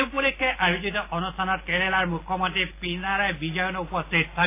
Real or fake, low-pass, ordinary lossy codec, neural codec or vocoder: real; 3.6 kHz; AAC, 24 kbps; none